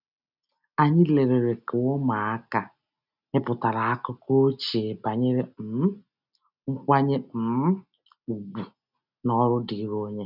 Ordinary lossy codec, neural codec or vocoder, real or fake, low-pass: none; none; real; 5.4 kHz